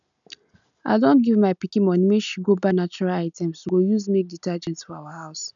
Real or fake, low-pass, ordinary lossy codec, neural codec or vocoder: real; 7.2 kHz; none; none